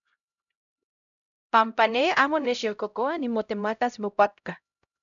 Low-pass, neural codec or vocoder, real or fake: 7.2 kHz; codec, 16 kHz, 0.5 kbps, X-Codec, HuBERT features, trained on LibriSpeech; fake